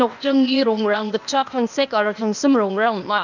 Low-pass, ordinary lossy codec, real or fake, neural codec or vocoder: 7.2 kHz; none; fake; codec, 16 kHz, 0.8 kbps, ZipCodec